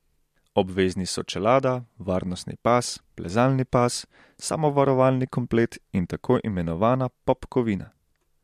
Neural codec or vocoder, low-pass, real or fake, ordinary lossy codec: none; 14.4 kHz; real; MP3, 64 kbps